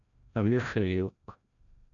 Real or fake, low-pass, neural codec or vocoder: fake; 7.2 kHz; codec, 16 kHz, 0.5 kbps, FreqCodec, larger model